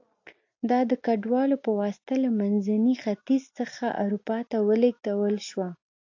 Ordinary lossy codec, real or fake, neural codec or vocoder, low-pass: AAC, 48 kbps; real; none; 7.2 kHz